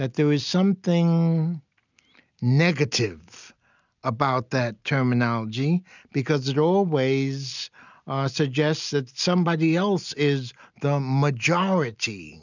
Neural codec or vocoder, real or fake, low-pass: none; real; 7.2 kHz